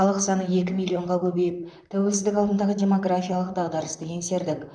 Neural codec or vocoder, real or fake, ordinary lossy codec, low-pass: vocoder, 44.1 kHz, 128 mel bands, Pupu-Vocoder; fake; none; 9.9 kHz